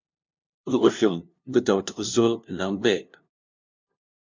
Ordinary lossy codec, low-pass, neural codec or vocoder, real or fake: MP3, 64 kbps; 7.2 kHz; codec, 16 kHz, 0.5 kbps, FunCodec, trained on LibriTTS, 25 frames a second; fake